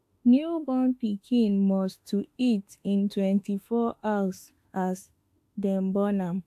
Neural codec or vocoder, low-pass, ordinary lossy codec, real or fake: autoencoder, 48 kHz, 32 numbers a frame, DAC-VAE, trained on Japanese speech; 14.4 kHz; AAC, 64 kbps; fake